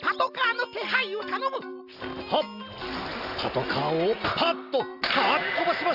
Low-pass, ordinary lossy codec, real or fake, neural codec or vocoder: 5.4 kHz; Opus, 64 kbps; real; none